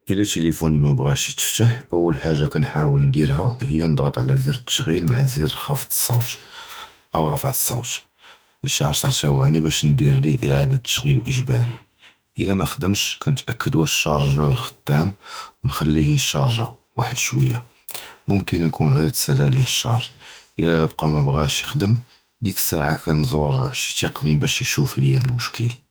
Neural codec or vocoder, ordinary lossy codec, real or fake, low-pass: autoencoder, 48 kHz, 32 numbers a frame, DAC-VAE, trained on Japanese speech; none; fake; none